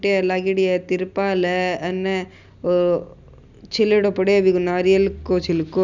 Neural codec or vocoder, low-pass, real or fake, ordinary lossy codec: none; 7.2 kHz; real; none